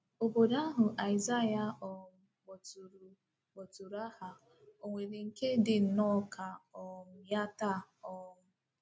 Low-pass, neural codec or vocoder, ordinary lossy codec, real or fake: none; none; none; real